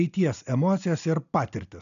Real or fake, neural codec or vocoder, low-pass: real; none; 7.2 kHz